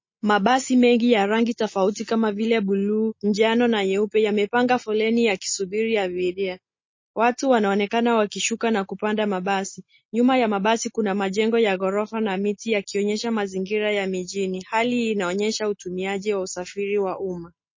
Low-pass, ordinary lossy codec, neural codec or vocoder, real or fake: 7.2 kHz; MP3, 32 kbps; none; real